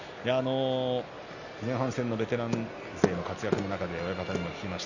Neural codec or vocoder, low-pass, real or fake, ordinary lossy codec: none; 7.2 kHz; real; none